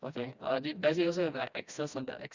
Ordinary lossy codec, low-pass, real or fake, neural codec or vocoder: Opus, 64 kbps; 7.2 kHz; fake; codec, 16 kHz, 1 kbps, FreqCodec, smaller model